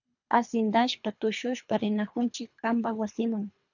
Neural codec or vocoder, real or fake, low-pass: codec, 24 kHz, 3 kbps, HILCodec; fake; 7.2 kHz